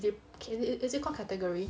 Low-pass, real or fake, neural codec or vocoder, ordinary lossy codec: none; real; none; none